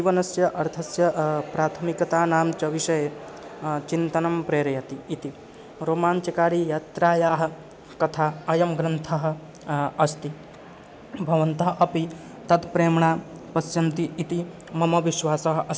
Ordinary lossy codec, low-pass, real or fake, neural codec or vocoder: none; none; real; none